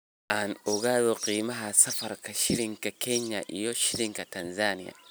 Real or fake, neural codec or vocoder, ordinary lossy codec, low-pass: real; none; none; none